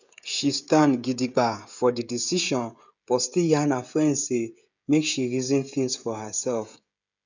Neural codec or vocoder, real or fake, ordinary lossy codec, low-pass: codec, 16 kHz, 16 kbps, FreqCodec, smaller model; fake; none; 7.2 kHz